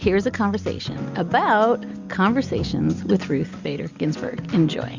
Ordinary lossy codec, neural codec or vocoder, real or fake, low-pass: Opus, 64 kbps; none; real; 7.2 kHz